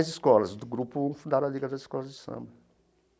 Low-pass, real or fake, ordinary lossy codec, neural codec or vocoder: none; real; none; none